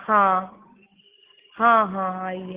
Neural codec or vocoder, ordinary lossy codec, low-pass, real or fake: none; Opus, 16 kbps; 3.6 kHz; real